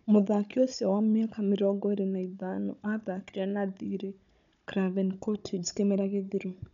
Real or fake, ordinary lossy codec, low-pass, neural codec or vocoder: fake; none; 7.2 kHz; codec, 16 kHz, 16 kbps, FunCodec, trained on Chinese and English, 50 frames a second